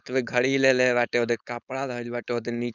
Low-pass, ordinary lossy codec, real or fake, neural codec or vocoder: 7.2 kHz; none; fake; codec, 16 kHz, 4.8 kbps, FACodec